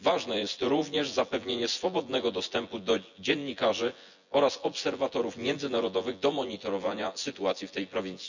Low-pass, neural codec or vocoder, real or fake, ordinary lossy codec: 7.2 kHz; vocoder, 24 kHz, 100 mel bands, Vocos; fake; none